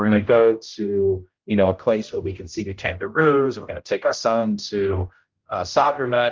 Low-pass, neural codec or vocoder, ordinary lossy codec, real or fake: 7.2 kHz; codec, 16 kHz, 0.5 kbps, X-Codec, HuBERT features, trained on general audio; Opus, 24 kbps; fake